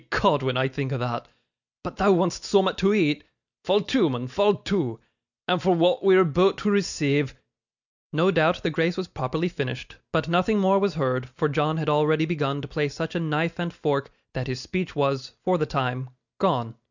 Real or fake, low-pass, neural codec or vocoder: real; 7.2 kHz; none